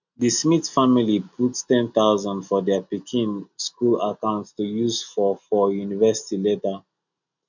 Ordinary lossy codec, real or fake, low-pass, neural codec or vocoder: none; real; 7.2 kHz; none